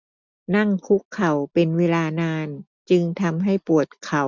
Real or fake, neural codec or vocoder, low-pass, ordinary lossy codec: real; none; 7.2 kHz; none